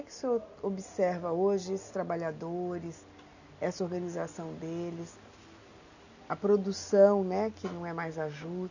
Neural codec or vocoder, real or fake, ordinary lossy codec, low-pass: none; real; none; 7.2 kHz